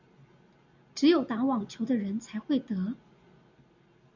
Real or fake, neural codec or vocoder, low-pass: real; none; 7.2 kHz